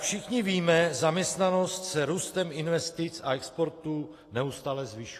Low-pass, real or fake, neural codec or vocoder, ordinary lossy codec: 14.4 kHz; real; none; AAC, 48 kbps